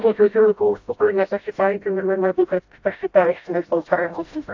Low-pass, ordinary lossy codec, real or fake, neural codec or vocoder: 7.2 kHz; AAC, 48 kbps; fake; codec, 16 kHz, 0.5 kbps, FreqCodec, smaller model